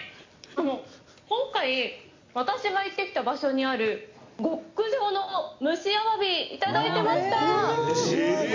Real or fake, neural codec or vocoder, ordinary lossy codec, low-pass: real; none; MP3, 48 kbps; 7.2 kHz